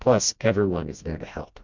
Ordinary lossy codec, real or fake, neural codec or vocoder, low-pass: MP3, 64 kbps; fake; codec, 16 kHz, 1 kbps, FreqCodec, smaller model; 7.2 kHz